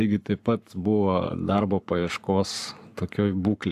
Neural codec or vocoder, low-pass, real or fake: codec, 44.1 kHz, 7.8 kbps, Pupu-Codec; 14.4 kHz; fake